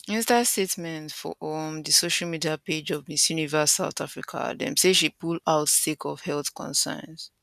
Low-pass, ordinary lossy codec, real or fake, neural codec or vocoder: 14.4 kHz; none; real; none